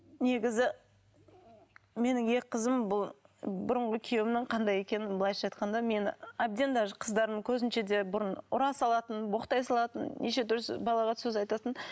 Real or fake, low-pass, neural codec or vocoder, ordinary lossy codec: real; none; none; none